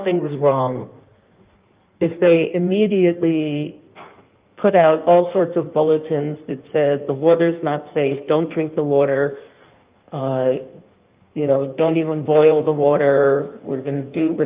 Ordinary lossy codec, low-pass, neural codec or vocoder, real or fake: Opus, 24 kbps; 3.6 kHz; codec, 16 kHz in and 24 kHz out, 1.1 kbps, FireRedTTS-2 codec; fake